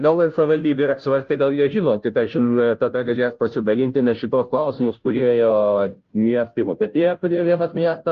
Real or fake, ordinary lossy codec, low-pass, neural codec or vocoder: fake; Opus, 32 kbps; 7.2 kHz; codec, 16 kHz, 0.5 kbps, FunCodec, trained on Chinese and English, 25 frames a second